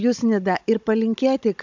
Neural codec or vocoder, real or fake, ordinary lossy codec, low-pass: codec, 16 kHz, 16 kbps, FunCodec, trained on Chinese and English, 50 frames a second; fake; MP3, 64 kbps; 7.2 kHz